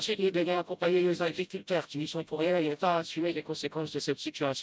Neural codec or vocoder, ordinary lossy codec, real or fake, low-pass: codec, 16 kHz, 0.5 kbps, FreqCodec, smaller model; none; fake; none